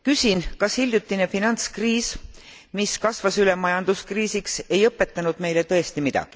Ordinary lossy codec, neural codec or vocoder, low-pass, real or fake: none; none; none; real